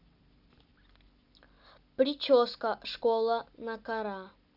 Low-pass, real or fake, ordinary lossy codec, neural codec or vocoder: 5.4 kHz; real; none; none